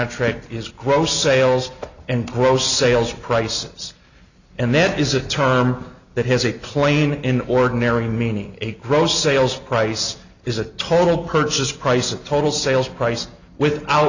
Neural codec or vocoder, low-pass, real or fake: none; 7.2 kHz; real